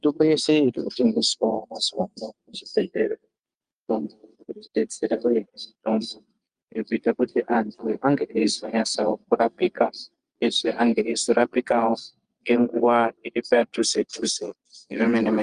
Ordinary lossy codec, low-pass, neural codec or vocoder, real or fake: Opus, 32 kbps; 9.9 kHz; vocoder, 22.05 kHz, 80 mel bands, Vocos; fake